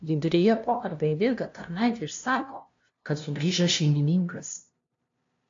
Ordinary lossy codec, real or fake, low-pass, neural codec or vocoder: AAC, 48 kbps; fake; 7.2 kHz; codec, 16 kHz, 0.5 kbps, FunCodec, trained on LibriTTS, 25 frames a second